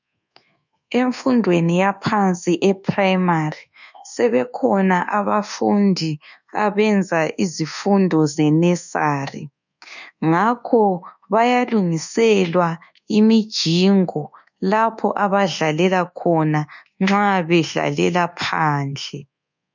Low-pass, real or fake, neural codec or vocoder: 7.2 kHz; fake; codec, 24 kHz, 1.2 kbps, DualCodec